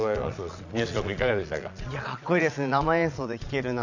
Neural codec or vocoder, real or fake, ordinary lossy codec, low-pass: vocoder, 22.05 kHz, 80 mel bands, Vocos; fake; none; 7.2 kHz